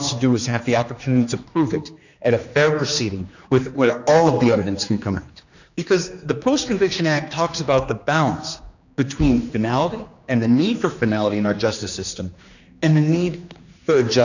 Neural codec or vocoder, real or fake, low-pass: codec, 16 kHz, 2 kbps, X-Codec, HuBERT features, trained on general audio; fake; 7.2 kHz